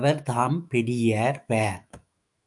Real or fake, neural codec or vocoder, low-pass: fake; autoencoder, 48 kHz, 128 numbers a frame, DAC-VAE, trained on Japanese speech; 10.8 kHz